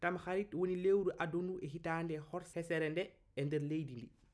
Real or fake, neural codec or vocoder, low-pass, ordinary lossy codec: real; none; none; none